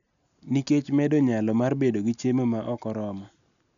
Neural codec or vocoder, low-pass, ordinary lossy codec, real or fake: none; 7.2 kHz; none; real